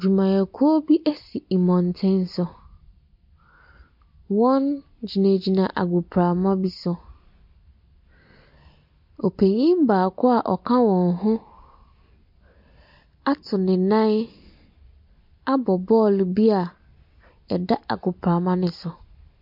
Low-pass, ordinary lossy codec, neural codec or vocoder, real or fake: 5.4 kHz; MP3, 48 kbps; none; real